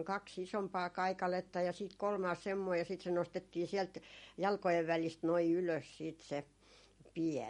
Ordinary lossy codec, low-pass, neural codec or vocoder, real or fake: MP3, 48 kbps; 14.4 kHz; none; real